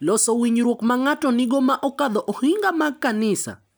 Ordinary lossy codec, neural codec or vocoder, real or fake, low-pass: none; none; real; none